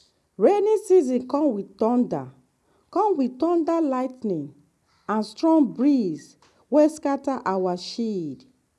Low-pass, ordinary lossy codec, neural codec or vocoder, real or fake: none; none; none; real